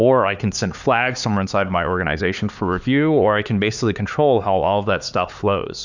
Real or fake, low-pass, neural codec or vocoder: fake; 7.2 kHz; codec, 16 kHz, 4 kbps, X-Codec, HuBERT features, trained on LibriSpeech